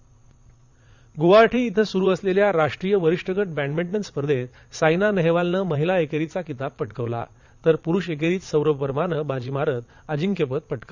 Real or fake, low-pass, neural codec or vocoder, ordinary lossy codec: fake; 7.2 kHz; vocoder, 22.05 kHz, 80 mel bands, Vocos; Opus, 64 kbps